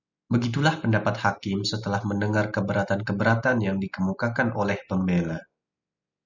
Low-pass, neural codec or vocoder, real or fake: 7.2 kHz; none; real